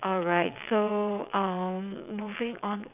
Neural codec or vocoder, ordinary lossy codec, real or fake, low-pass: vocoder, 22.05 kHz, 80 mel bands, WaveNeXt; none; fake; 3.6 kHz